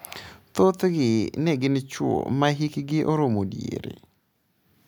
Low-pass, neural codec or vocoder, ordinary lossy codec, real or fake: none; none; none; real